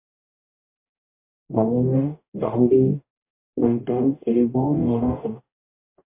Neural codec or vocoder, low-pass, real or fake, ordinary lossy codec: codec, 44.1 kHz, 0.9 kbps, DAC; 3.6 kHz; fake; MP3, 24 kbps